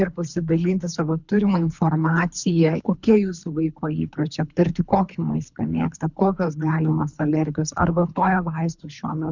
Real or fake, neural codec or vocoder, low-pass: fake; codec, 24 kHz, 3 kbps, HILCodec; 7.2 kHz